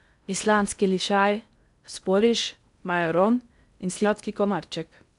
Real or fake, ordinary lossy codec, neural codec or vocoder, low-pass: fake; none; codec, 16 kHz in and 24 kHz out, 0.6 kbps, FocalCodec, streaming, 2048 codes; 10.8 kHz